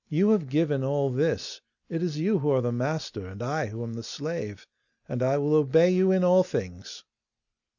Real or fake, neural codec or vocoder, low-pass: real; none; 7.2 kHz